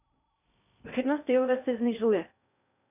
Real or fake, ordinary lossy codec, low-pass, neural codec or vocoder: fake; none; 3.6 kHz; codec, 16 kHz in and 24 kHz out, 0.6 kbps, FocalCodec, streaming, 2048 codes